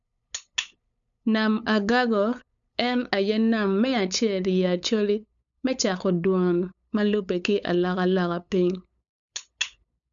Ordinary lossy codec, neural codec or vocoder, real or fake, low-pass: none; codec, 16 kHz, 8 kbps, FunCodec, trained on LibriTTS, 25 frames a second; fake; 7.2 kHz